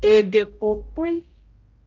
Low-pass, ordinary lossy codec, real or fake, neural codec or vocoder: 7.2 kHz; Opus, 32 kbps; fake; codec, 16 kHz, 0.5 kbps, X-Codec, HuBERT features, trained on general audio